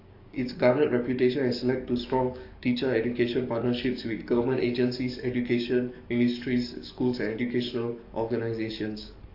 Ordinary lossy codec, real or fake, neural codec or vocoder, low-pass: AAC, 32 kbps; fake; codec, 44.1 kHz, 7.8 kbps, DAC; 5.4 kHz